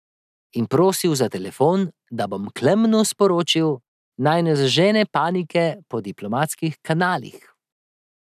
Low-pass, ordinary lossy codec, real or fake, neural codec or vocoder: 14.4 kHz; none; real; none